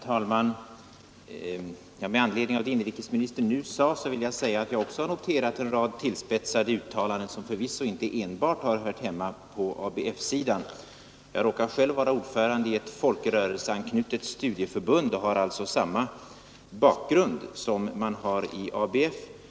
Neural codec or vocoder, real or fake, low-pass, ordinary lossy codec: none; real; none; none